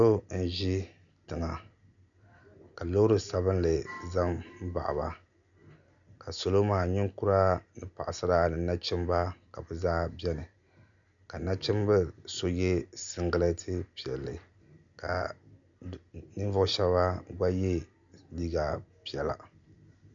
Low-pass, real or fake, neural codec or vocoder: 7.2 kHz; real; none